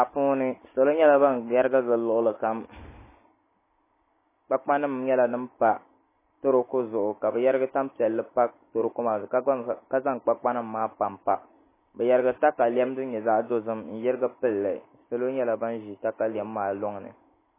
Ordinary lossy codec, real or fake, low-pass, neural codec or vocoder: MP3, 16 kbps; real; 3.6 kHz; none